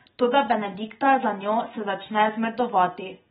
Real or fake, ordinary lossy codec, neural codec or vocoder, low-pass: real; AAC, 16 kbps; none; 10.8 kHz